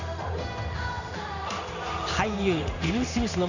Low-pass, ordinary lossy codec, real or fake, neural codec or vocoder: 7.2 kHz; none; fake; codec, 16 kHz in and 24 kHz out, 1 kbps, XY-Tokenizer